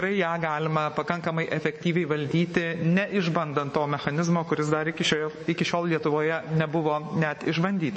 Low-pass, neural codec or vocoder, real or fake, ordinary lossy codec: 10.8 kHz; codec, 24 kHz, 3.1 kbps, DualCodec; fake; MP3, 32 kbps